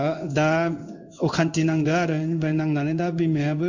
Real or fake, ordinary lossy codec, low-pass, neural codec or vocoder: fake; none; 7.2 kHz; codec, 16 kHz in and 24 kHz out, 1 kbps, XY-Tokenizer